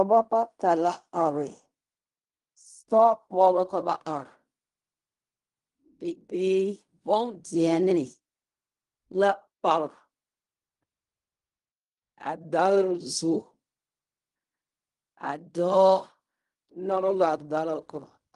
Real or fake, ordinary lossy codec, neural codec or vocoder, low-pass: fake; Opus, 32 kbps; codec, 16 kHz in and 24 kHz out, 0.4 kbps, LongCat-Audio-Codec, fine tuned four codebook decoder; 10.8 kHz